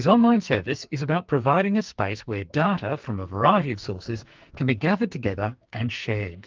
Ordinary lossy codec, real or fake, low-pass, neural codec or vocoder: Opus, 24 kbps; fake; 7.2 kHz; codec, 44.1 kHz, 2.6 kbps, SNAC